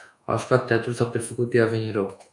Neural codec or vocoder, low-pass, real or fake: codec, 24 kHz, 1.2 kbps, DualCodec; 10.8 kHz; fake